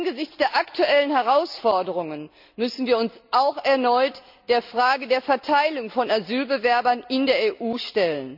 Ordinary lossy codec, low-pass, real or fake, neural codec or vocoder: none; 5.4 kHz; real; none